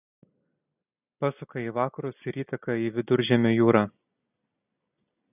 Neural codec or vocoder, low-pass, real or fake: none; 3.6 kHz; real